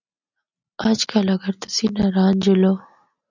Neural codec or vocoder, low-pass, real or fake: none; 7.2 kHz; real